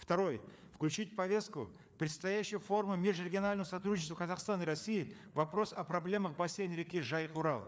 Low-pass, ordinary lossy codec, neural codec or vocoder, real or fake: none; none; codec, 16 kHz, 4 kbps, FreqCodec, larger model; fake